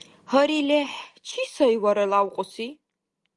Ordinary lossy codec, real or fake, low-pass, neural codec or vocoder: Opus, 32 kbps; real; 10.8 kHz; none